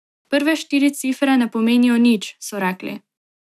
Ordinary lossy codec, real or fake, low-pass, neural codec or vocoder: none; real; 14.4 kHz; none